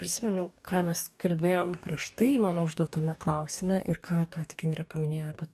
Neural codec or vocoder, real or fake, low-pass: codec, 44.1 kHz, 2.6 kbps, DAC; fake; 14.4 kHz